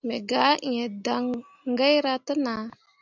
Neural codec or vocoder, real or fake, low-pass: none; real; 7.2 kHz